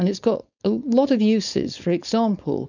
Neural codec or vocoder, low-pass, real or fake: codec, 16 kHz, 4.8 kbps, FACodec; 7.2 kHz; fake